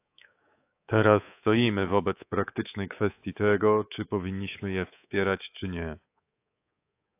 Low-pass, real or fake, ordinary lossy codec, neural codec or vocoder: 3.6 kHz; fake; AAC, 24 kbps; codec, 24 kHz, 3.1 kbps, DualCodec